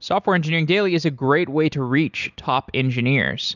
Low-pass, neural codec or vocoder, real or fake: 7.2 kHz; none; real